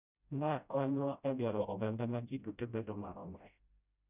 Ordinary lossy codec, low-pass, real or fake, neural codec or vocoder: none; 3.6 kHz; fake; codec, 16 kHz, 0.5 kbps, FreqCodec, smaller model